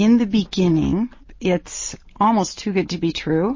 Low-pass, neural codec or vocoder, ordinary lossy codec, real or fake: 7.2 kHz; none; MP3, 32 kbps; real